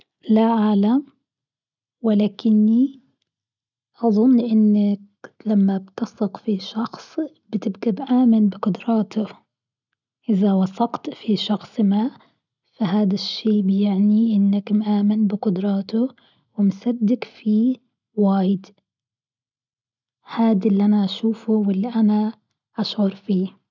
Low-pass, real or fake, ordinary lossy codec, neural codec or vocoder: 7.2 kHz; real; none; none